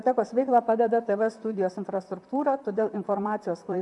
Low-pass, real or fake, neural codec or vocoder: 10.8 kHz; fake; vocoder, 44.1 kHz, 128 mel bands, Pupu-Vocoder